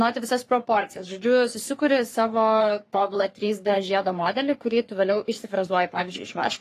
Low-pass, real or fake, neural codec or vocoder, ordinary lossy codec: 14.4 kHz; fake; codec, 44.1 kHz, 3.4 kbps, Pupu-Codec; AAC, 48 kbps